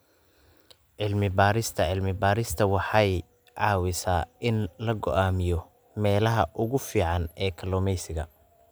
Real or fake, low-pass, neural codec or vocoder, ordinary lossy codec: real; none; none; none